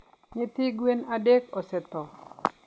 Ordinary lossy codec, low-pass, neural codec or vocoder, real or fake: none; none; none; real